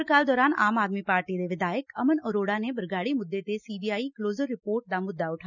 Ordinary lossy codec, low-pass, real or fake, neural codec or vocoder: none; 7.2 kHz; real; none